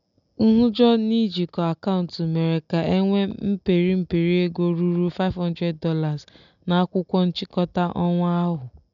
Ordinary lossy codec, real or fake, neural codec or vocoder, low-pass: none; real; none; 7.2 kHz